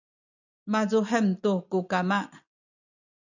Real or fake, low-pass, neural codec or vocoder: real; 7.2 kHz; none